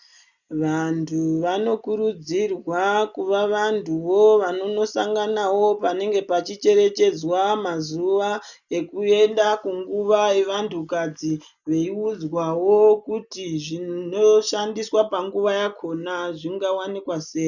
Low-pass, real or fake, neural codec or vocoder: 7.2 kHz; real; none